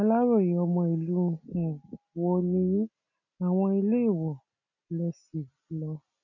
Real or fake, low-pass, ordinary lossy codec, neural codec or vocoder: real; 7.2 kHz; none; none